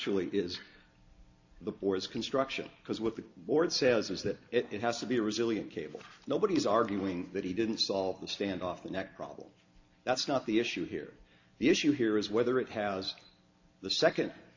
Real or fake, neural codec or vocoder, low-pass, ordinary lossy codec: real; none; 7.2 kHz; AAC, 48 kbps